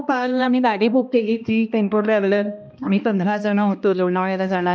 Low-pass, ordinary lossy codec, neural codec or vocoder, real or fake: none; none; codec, 16 kHz, 1 kbps, X-Codec, HuBERT features, trained on balanced general audio; fake